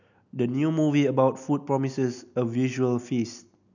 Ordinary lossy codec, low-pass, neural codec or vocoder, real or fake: none; 7.2 kHz; none; real